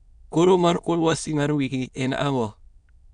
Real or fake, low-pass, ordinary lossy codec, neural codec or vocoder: fake; 9.9 kHz; none; autoencoder, 22.05 kHz, a latent of 192 numbers a frame, VITS, trained on many speakers